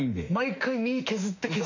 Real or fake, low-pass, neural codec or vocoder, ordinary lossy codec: fake; 7.2 kHz; autoencoder, 48 kHz, 32 numbers a frame, DAC-VAE, trained on Japanese speech; none